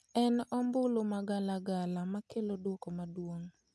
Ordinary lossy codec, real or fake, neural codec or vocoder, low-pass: none; real; none; none